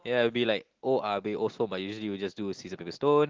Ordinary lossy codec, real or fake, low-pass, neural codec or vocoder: Opus, 16 kbps; real; 7.2 kHz; none